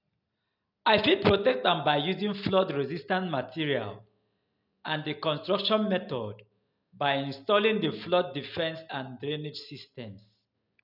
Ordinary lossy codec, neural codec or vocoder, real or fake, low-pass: none; none; real; 5.4 kHz